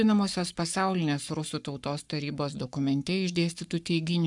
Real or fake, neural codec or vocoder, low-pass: fake; codec, 44.1 kHz, 7.8 kbps, Pupu-Codec; 10.8 kHz